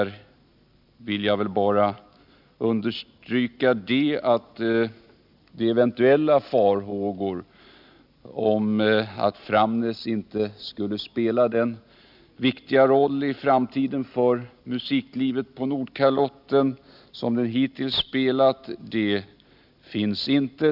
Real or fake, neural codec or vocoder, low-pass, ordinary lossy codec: real; none; 5.4 kHz; none